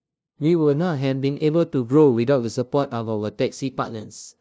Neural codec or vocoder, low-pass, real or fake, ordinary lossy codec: codec, 16 kHz, 0.5 kbps, FunCodec, trained on LibriTTS, 25 frames a second; none; fake; none